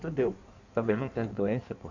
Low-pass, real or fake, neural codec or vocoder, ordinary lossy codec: 7.2 kHz; fake; codec, 16 kHz in and 24 kHz out, 1.1 kbps, FireRedTTS-2 codec; none